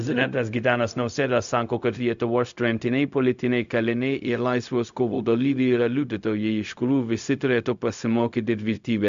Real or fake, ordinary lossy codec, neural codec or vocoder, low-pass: fake; MP3, 64 kbps; codec, 16 kHz, 0.4 kbps, LongCat-Audio-Codec; 7.2 kHz